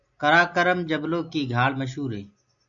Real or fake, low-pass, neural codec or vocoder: real; 7.2 kHz; none